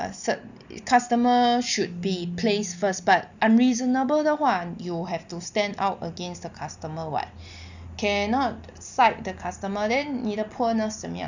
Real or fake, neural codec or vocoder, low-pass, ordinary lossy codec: real; none; 7.2 kHz; none